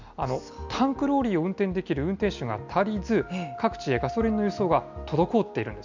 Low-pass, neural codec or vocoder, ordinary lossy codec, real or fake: 7.2 kHz; none; none; real